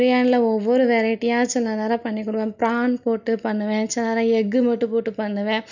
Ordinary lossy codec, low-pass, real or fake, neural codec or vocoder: none; 7.2 kHz; real; none